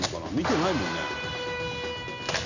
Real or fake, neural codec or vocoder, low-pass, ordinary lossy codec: real; none; 7.2 kHz; none